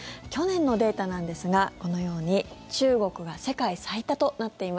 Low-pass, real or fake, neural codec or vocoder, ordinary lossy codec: none; real; none; none